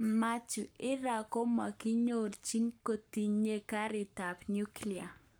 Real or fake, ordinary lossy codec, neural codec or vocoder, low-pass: fake; none; codec, 44.1 kHz, 7.8 kbps, Pupu-Codec; none